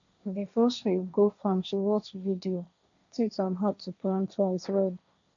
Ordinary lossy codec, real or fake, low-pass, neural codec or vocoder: none; fake; 7.2 kHz; codec, 16 kHz, 1.1 kbps, Voila-Tokenizer